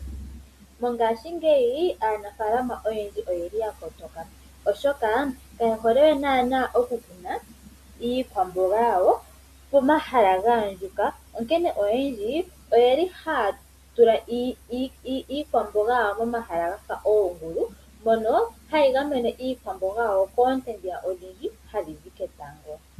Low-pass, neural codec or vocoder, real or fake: 14.4 kHz; none; real